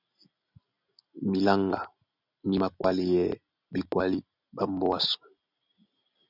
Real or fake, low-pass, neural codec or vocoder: real; 5.4 kHz; none